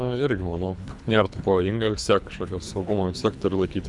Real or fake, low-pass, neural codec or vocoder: fake; 10.8 kHz; codec, 24 kHz, 3 kbps, HILCodec